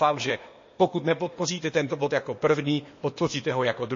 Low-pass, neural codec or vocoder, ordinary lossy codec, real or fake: 7.2 kHz; codec, 16 kHz, 0.8 kbps, ZipCodec; MP3, 32 kbps; fake